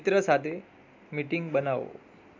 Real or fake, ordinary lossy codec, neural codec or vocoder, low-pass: real; MP3, 64 kbps; none; 7.2 kHz